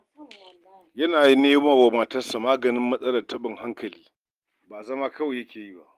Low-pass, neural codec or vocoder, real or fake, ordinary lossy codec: 14.4 kHz; none; real; Opus, 24 kbps